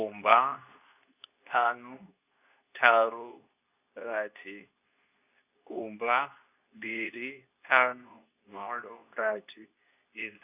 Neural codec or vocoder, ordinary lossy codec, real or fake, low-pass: codec, 24 kHz, 0.9 kbps, WavTokenizer, medium speech release version 2; none; fake; 3.6 kHz